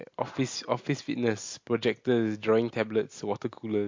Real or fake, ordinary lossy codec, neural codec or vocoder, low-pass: real; MP3, 48 kbps; none; 7.2 kHz